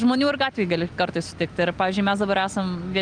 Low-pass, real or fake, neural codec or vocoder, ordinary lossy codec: 9.9 kHz; real; none; Opus, 32 kbps